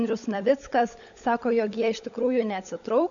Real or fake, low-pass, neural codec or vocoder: fake; 7.2 kHz; codec, 16 kHz, 16 kbps, FunCodec, trained on LibriTTS, 50 frames a second